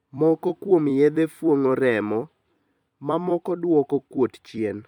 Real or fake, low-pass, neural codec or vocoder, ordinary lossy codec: fake; 19.8 kHz; vocoder, 44.1 kHz, 128 mel bands every 256 samples, BigVGAN v2; MP3, 96 kbps